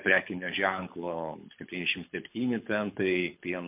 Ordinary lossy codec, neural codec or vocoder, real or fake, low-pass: MP3, 32 kbps; codec, 24 kHz, 6 kbps, HILCodec; fake; 3.6 kHz